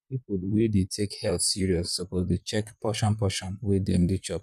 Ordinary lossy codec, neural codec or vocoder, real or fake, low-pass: none; vocoder, 44.1 kHz, 128 mel bands, Pupu-Vocoder; fake; 14.4 kHz